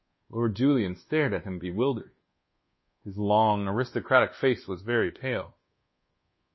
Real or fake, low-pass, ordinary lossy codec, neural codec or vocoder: fake; 7.2 kHz; MP3, 24 kbps; codec, 24 kHz, 1.2 kbps, DualCodec